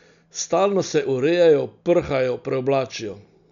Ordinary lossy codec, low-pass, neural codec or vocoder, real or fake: none; 7.2 kHz; none; real